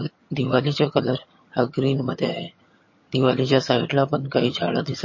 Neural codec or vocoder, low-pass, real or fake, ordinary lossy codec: vocoder, 22.05 kHz, 80 mel bands, HiFi-GAN; 7.2 kHz; fake; MP3, 32 kbps